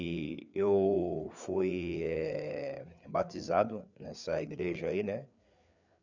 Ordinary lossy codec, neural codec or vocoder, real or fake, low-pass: none; codec, 16 kHz, 4 kbps, FreqCodec, larger model; fake; 7.2 kHz